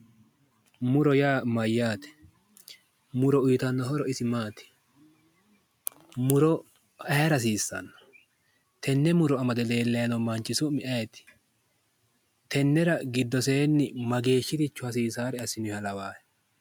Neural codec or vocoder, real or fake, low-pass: none; real; 19.8 kHz